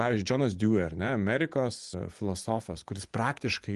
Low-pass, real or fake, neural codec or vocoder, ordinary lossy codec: 10.8 kHz; fake; vocoder, 24 kHz, 100 mel bands, Vocos; Opus, 24 kbps